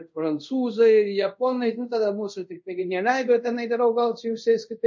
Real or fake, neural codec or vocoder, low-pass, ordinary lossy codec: fake; codec, 24 kHz, 0.5 kbps, DualCodec; 7.2 kHz; MP3, 48 kbps